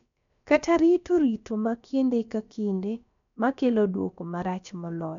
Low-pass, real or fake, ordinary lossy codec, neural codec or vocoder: 7.2 kHz; fake; none; codec, 16 kHz, about 1 kbps, DyCAST, with the encoder's durations